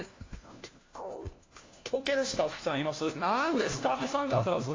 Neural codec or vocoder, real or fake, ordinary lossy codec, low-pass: codec, 16 kHz, 1 kbps, FunCodec, trained on LibriTTS, 50 frames a second; fake; AAC, 32 kbps; 7.2 kHz